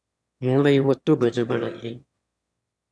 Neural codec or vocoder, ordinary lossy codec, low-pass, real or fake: autoencoder, 22.05 kHz, a latent of 192 numbers a frame, VITS, trained on one speaker; none; none; fake